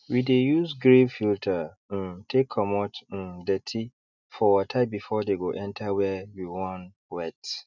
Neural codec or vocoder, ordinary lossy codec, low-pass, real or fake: none; none; 7.2 kHz; real